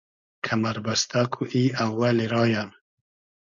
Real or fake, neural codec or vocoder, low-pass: fake; codec, 16 kHz, 4.8 kbps, FACodec; 7.2 kHz